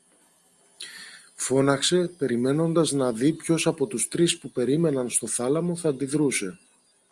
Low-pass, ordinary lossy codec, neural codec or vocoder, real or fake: 10.8 kHz; Opus, 32 kbps; none; real